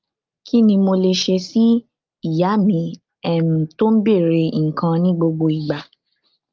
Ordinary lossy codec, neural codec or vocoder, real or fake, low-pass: Opus, 32 kbps; none; real; 7.2 kHz